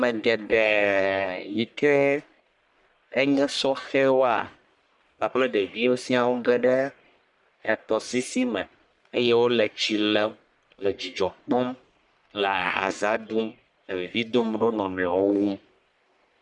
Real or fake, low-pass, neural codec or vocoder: fake; 10.8 kHz; codec, 44.1 kHz, 1.7 kbps, Pupu-Codec